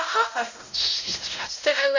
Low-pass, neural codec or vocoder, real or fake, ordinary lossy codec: 7.2 kHz; codec, 16 kHz, 0.5 kbps, X-Codec, WavLM features, trained on Multilingual LibriSpeech; fake; none